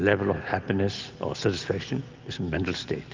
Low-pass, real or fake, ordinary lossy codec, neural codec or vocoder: 7.2 kHz; real; Opus, 32 kbps; none